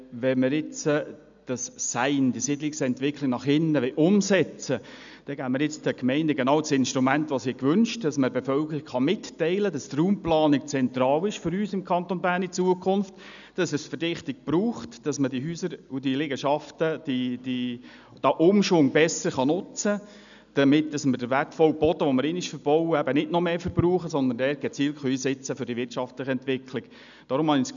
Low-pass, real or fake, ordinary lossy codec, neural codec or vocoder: 7.2 kHz; real; none; none